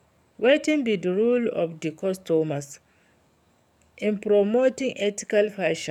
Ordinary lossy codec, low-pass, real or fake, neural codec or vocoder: none; 19.8 kHz; fake; codec, 44.1 kHz, 7.8 kbps, DAC